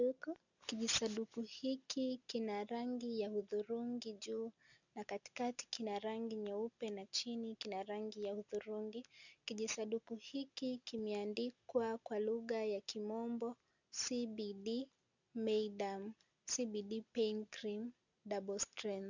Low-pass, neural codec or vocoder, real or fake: 7.2 kHz; none; real